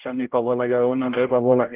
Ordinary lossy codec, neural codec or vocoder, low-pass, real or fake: Opus, 16 kbps; codec, 16 kHz, 0.5 kbps, X-Codec, HuBERT features, trained on general audio; 3.6 kHz; fake